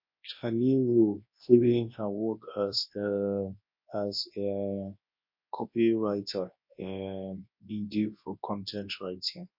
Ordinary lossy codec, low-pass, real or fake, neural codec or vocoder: MP3, 32 kbps; 5.4 kHz; fake; codec, 24 kHz, 0.9 kbps, WavTokenizer, large speech release